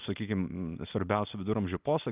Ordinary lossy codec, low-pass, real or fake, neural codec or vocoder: Opus, 24 kbps; 3.6 kHz; real; none